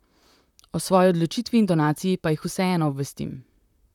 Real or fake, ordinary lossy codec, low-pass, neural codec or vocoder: fake; none; 19.8 kHz; vocoder, 44.1 kHz, 128 mel bands, Pupu-Vocoder